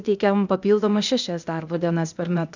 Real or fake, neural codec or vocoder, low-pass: fake; codec, 16 kHz, 0.8 kbps, ZipCodec; 7.2 kHz